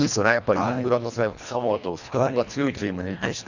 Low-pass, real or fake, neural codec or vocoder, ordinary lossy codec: 7.2 kHz; fake; codec, 24 kHz, 1.5 kbps, HILCodec; none